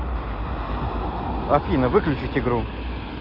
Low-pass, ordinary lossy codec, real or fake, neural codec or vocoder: 5.4 kHz; Opus, 32 kbps; real; none